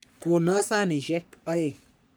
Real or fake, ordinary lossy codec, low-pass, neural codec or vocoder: fake; none; none; codec, 44.1 kHz, 3.4 kbps, Pupu-Codec